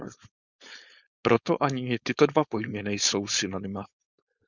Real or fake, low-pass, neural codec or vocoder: fake; 7.2 kHz; codec, 16 kHz, 4.8 kbps, FACodec